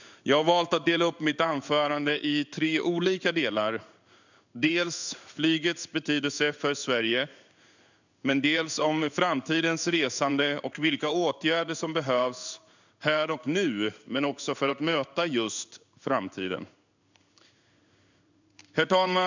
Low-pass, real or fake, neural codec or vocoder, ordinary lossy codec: 7.2 kHz; fake; codec, 16 kHz in and 24 kHz out, 1 kbps, XY-Tokenizer; none